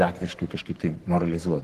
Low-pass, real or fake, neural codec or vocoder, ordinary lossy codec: 14.4 kHz; fake; codec, 44.1 kHz, 3.4 kbps, Pupu-Codec; Opus, 16 kbps